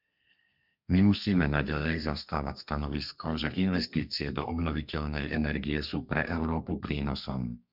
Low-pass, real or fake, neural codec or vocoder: 5.4 kHz; fake; codec, 32 kHz, 1.9 kbps, SNAC